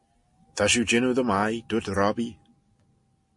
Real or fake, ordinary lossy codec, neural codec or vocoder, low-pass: real; MP3, 48 kbps; none; 10.8 kHz